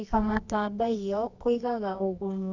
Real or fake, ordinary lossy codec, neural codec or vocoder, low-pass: fake; Opus, 64 kbps; codec, 24 kHz, 0.9 kbps, WavTokenizer, medium music audio release; 7.2 kHz